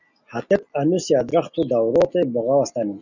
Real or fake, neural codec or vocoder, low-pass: real; none; 7.2 kHz